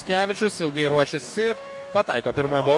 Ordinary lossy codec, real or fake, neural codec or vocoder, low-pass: MP3, 64 kbps; fake; codec, 44.1 kHz, 2.6 kbps, DAC; 10.8 kHz